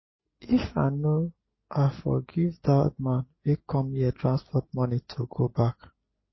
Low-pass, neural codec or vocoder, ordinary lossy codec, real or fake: 7.2 kHz; none; MP3, 24 kbps; real